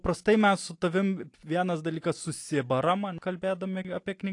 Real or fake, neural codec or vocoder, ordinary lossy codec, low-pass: real; none; AAC, 48 kbps; 10.8 kHz